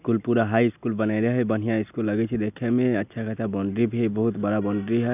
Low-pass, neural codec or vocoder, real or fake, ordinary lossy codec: 3.6 kHz; none; real; none